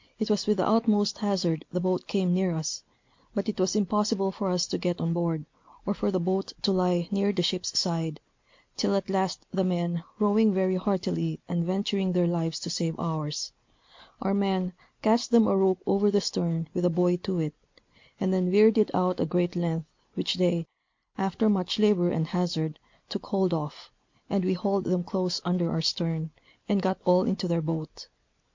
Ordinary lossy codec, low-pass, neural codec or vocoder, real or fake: MP3, 48 kbps; 7.2 kHz; none; real